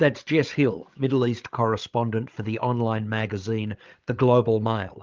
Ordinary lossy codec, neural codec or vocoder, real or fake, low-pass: Opus, 32 kbps; codec, 16 kHz, 8 kbps, FreqCodec, larger model; fake; 7.2 kHz